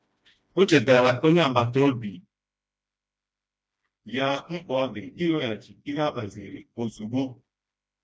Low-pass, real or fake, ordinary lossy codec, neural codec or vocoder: none; fake; none; codec, 16 kHz, 1 kbps, FreqCodec, smaller model